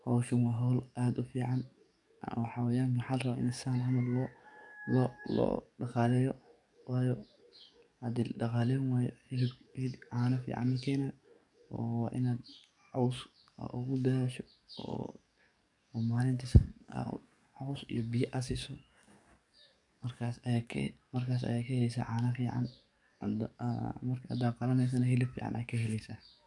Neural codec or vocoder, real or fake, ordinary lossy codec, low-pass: codec, 44.1 kHz, 7.8 kbps, DAC; fake; none; 10.8 kHz